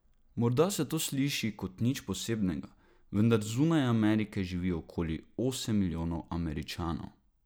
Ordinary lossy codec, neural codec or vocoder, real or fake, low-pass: none; none; real; none